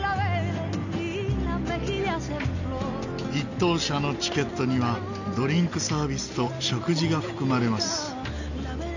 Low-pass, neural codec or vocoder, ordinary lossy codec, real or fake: 7.2 kHz; none; none; real